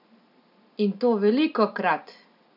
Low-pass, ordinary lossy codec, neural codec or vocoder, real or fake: 5.4 kHz; none; none; real